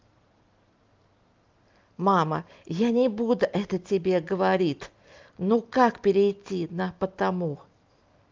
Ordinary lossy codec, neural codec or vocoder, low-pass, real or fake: Opus, 32 kbps; none; 7.2 kHz; real